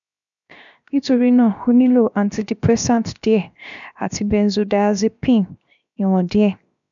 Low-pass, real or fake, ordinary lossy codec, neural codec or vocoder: 7.2 kHz; fake; none; codec, 16 kHz, 0.7 kbps, FocalCodec